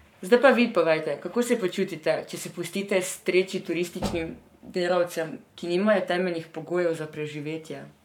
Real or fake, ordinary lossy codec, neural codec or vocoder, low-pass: fake; none; codec, 44.1 kHz, 7.8 kbps, Pupu-Codec; 19.8 kHz